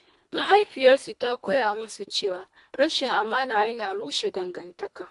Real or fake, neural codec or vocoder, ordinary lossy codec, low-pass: fake; codec, 24 kHz, 1.5 kbps, HILCodec; AAC, 64 kbps; 10.8 kHz